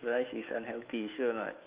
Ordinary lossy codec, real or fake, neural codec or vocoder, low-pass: Opus, 24 kbps; fake; autoencoder, 48 kHz, 128 numbers a frame, DAC-VAE, trained on Japanese speech; 3.6 kHz